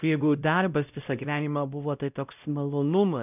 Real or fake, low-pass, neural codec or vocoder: fake; 3.6 kHz; codec, 16 kHz, 0.5 kbps, X-Codec, WavLM features, trained on Multilingual LibriSpeech